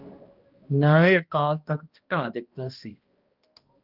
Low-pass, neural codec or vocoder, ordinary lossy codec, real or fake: 5.4 kHz; codec, 16 kHz, 1 kbps, X-Codec, HuBERT features, trained on balanced general audio; Opus, 24 kbps; fake